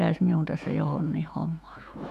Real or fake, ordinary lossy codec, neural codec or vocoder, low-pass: fake; none; autoencoder, 48 kHz, 128 numbers a frame, DAC-VAE, trained on Japanese speech; 14.4 kHz